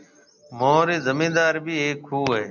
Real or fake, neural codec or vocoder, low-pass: real; none; 7.2 kHz